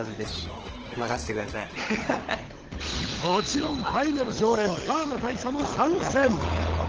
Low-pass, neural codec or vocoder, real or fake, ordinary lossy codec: 7.2 kHz; codec, 16 kHz, 16 kbps, FunCodec, trained on LibriTTS, 50 frames a second; fake; Opus, 16 kbps